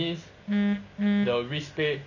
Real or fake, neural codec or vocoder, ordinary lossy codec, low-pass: real; none; none; 7.2 kHz